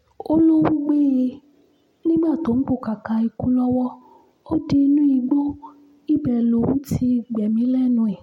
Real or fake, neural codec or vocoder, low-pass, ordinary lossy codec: real; none; 19.8 kHz; MP3, 64 kbps